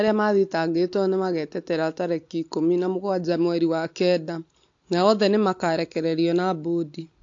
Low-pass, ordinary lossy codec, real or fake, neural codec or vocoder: 7.2 kHz; AAC, 48 kbps; real; none